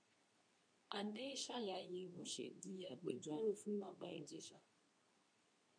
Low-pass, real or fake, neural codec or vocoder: 9.9 kHz; fake; codec, 24 kHz, 0.9 kbps, WavTokenizer, medium speech release version 2